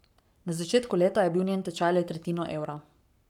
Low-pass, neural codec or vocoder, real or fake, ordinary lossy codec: 19.8 kHz; codec, 44.1 kHz, 7.8 kbps, Pupu-Codec; fake; none